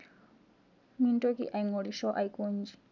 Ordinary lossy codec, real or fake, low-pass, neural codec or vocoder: none; real; 7.2 kHz; none